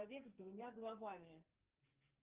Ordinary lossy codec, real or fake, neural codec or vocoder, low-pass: Opus, 24 kbps; fake; codec, 44.1 kHz, 2.6 kbps, SNAC; 3.6 kHz